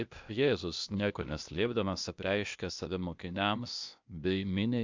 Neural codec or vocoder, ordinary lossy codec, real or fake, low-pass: codec, 16 kHz, 0.8 kbps, ZipCodec; MP3, 64 kbps; fake; 7.2 kHz